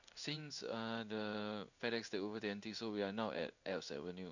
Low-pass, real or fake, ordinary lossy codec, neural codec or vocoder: 7.2 kHz; fake; none; codec, 16 kHz in and 24 kHz out, 1 kbps, XY-Tokenizer